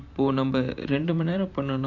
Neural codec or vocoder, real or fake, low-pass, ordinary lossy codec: vocoder, 44.1 kHz, 128 mel bands every 512 samples, BigVGAN v2; fake; 7.2 kHz; none